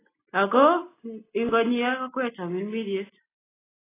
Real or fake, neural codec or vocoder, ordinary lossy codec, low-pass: real; none; AAC, 16 kbps; 3.6 kHz